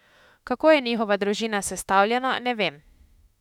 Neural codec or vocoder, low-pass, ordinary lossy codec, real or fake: autoencoder, 48 kHz, 32 numbers a frame, DAC-VAE, trained on Japanese speech; 19.8 kHz; none; fake